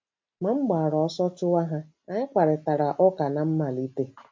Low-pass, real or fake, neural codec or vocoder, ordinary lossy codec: 7.2 kHz; real; none; MP3, 48 kbps